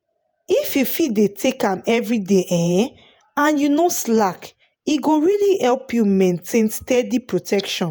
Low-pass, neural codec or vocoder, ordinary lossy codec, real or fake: none; vocoder, 48 kHz, 128 mel bands, Vocos; none; fake